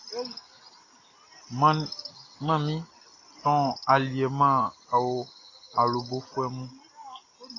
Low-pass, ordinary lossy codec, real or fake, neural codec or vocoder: 7.2 kHz; AAC, 32 kbps; real; none